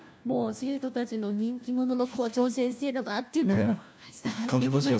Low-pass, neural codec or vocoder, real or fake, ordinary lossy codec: none; codec, 16 kHz, 1 kbps, FunCodec, trained on LibriTTS, 50 frames a second; fake; none